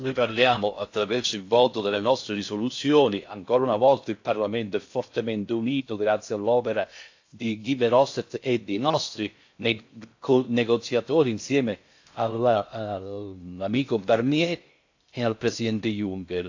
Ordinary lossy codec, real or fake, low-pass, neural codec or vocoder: AAC, 48 kbps; fake; 7.2 kHz; codec, 16 kHz in and 24 kHz out, 0.6 kbps, FocalCodec, streaming, 4096 codes